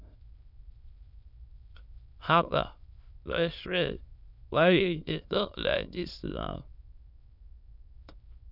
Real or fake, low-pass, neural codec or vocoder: fake; 5.4 kHz; autoencoder, 22.05 kHz, a latent of 192 numbers a frame, VITS, trained on many speakers